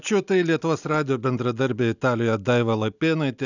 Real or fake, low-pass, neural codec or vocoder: real; 7.2 kHz; none